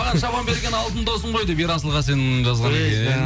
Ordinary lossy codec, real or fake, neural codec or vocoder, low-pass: none; real; none; none